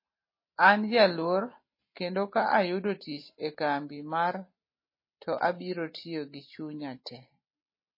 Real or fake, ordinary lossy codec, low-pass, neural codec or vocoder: real; MP3, 24 kbps; 5.4 kHz; none